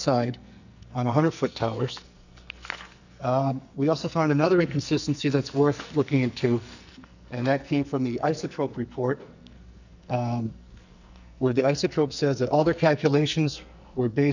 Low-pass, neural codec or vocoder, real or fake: 7.2 kHz; codec, 44.1 kHz, 2.6 kbps, SNAC; fake